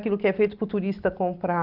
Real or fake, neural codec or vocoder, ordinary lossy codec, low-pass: real; none; Opus, 24 kbps; 5.4 kHz